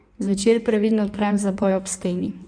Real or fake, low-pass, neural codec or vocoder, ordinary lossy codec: fake; 9.9 kHz; codec, 16 kHz in and 24 kHz out, 1.1 kbps, FireRedTTS-2 codec; none